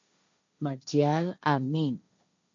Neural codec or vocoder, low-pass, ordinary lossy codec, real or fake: codec, 16 kHz, 1.1 kbps, Voila-Tokenizer; 7.2 kHz; AAC, 64 kbps; fake